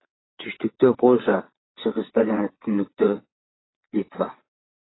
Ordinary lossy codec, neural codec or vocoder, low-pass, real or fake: AAC, 16 kbps; vocoder, 22.05 kHz, 80 mel bands, Vocos; 7.2 kHz; fake